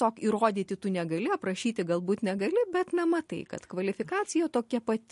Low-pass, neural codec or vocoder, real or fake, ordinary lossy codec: 14.4 kHz; none; real; MP3, 48 kbps